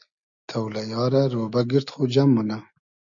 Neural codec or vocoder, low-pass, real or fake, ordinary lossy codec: none; 7.2 kHz; real; AAC, 64 kbps